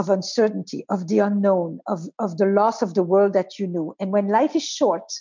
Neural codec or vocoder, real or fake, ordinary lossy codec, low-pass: none; real; MP3, 64 kbps; 7.2 kHz